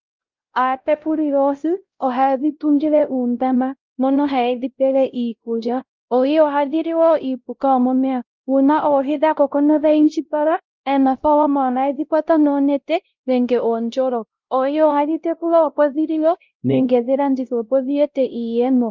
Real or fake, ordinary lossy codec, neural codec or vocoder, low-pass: fake; Opus, 24 kbps; codec, 16 kHz, 0.5 kbps, X-Codec, WavLM features, trained on Multilingual LibriSpeech; 7.2 kHz